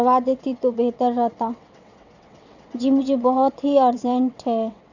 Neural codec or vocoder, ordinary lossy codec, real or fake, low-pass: vocoder, 22.05 kHz, 80 mel bands, WaveNeXt; none; fake; 7.2 kHz